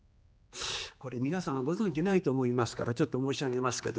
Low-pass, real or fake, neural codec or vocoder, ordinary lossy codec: none; fake; codec, 16 kHz, 2 kbps, X-Codec, HuBERT features, trained on general audio; none